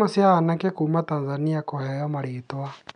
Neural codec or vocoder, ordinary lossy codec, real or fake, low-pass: none; none; real; 9.9 kHz